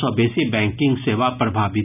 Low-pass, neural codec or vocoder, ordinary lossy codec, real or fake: 3.6 kHz; none; none; real